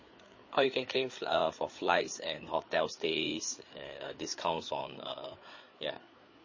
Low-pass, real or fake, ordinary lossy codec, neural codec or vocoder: 7.2 kHz; fake; MP3, 32 kbps; codec, 16 kHz, 4 kbps, FreqCodec, larger model